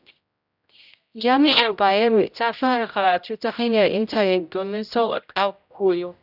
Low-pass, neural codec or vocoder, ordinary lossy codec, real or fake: 5.4 kHz; codec, 16 kHz, 0.5 kbps, X-Codec, HuBERT features, trained on general audio; none; fake